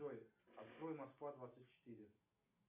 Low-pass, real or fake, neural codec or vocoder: 3.6 kHz; real; none